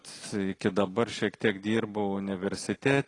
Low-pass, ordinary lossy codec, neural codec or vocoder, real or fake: 10.8 kHz; AAC, 32 kbps; none; real